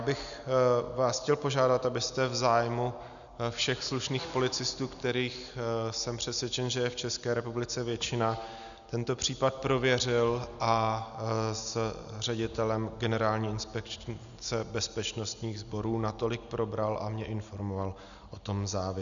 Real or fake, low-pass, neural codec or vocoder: real; 7.2 kHz; none